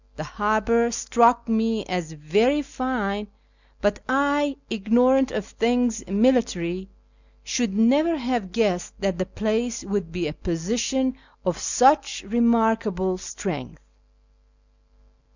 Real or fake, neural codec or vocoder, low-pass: real; none; 7.2 kHz